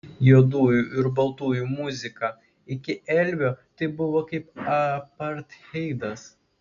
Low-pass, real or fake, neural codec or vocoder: 7.2 kHz; real; none